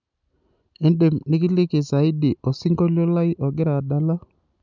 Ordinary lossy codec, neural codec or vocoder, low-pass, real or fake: none; none; 7.2 kHz; real